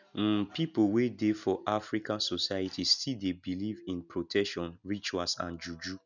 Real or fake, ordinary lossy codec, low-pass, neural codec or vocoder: real; none; 7.2 kHz; none